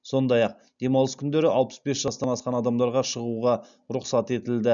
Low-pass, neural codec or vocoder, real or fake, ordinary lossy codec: 7.2 kHz; none; real; none